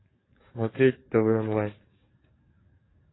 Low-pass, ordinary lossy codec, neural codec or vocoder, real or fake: 7.2 kHz; AAC, 16 kbps; codec, 44.1 kHz, 7.8 kbps, DAC; fake